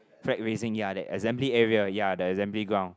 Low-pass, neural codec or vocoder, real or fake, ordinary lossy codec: none; none; real; none